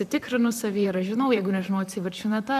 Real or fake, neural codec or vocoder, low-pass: fake; vocoder, 44.1 kHz, 128 mel bands, Pupu-Vocoder; 14.4 kHz